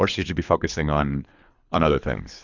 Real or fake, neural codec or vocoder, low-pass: fake; codec, 24 kHz, 3 kbps, HILCodec; 7.2 kHz